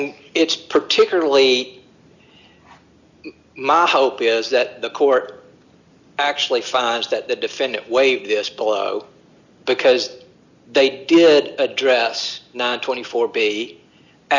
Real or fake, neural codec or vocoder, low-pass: real; none; 7.2 kHz